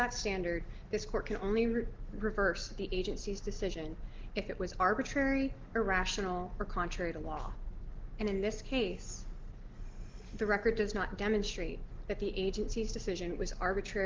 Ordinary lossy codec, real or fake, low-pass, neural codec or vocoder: Opus, 16 kbps; real; 7.2 kHz; none